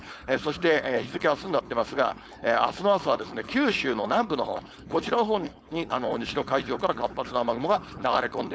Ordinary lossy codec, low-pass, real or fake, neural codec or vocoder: none; none; fake; codec, 16 kHz, 4.8 kbps, FACodec